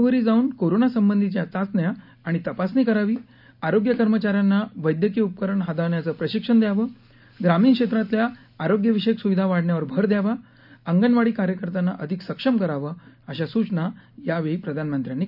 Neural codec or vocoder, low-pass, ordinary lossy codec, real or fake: none; 5.4 kHz; none; real